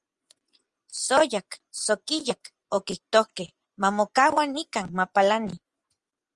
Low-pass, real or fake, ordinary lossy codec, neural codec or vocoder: 10.8 kHz; fake; Opus, 32 kbps; vocoder, 24 kHz, 100 mel bands, Vocos